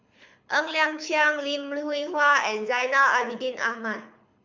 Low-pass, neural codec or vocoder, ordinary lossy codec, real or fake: 7.2 kHz; codec, 24 kHz, 6 kbps, HILCodec; MP3, 64 kbps; fake